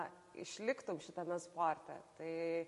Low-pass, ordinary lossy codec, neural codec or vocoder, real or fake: 14.4 kHz; MP3, 48 kbps; none; real